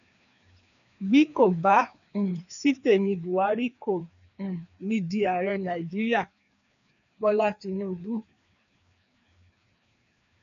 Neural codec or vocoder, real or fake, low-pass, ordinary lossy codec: codec, 16 kHz, 2 kbps, FreqCodec, larger model; fake; 7.2 kHz; none